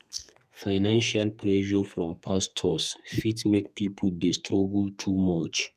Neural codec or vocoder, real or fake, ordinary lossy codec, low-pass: codec, 32 kHz, 1.9 kbps, SNAC; fake; none; 14.4 kHz